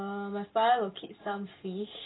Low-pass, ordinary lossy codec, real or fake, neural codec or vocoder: 7.2 kHz; AAC, 16 kbps; real; none